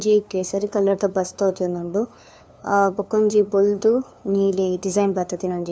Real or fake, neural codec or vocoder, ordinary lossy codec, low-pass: fake; codec, 16 kHz, 2 kbps, FunCodec, trained on LibriTTS, 25 frames a second; none; none